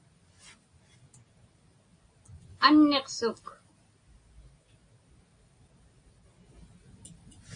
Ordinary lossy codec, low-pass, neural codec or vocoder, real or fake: AAC, 48 kbps; 9.9 kHz; none; real